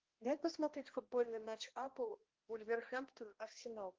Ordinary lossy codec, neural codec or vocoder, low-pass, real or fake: Opus, 16 kbps; codec, 16 kHz, 1 kbps, X-Codec, HuBERT features, trained on balanced general audio; 7.2 kHz; fake